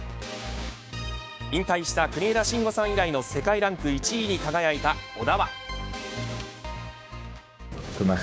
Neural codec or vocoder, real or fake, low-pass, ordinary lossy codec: codec, 16 kHz, 6 kbps, DAC; fake; none; none